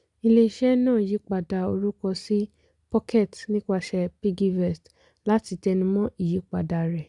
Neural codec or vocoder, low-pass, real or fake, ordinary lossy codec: none; 10.8 kHz; real; none